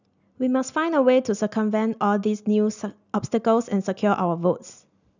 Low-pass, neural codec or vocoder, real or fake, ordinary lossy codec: 7.2 kHz; none; real; none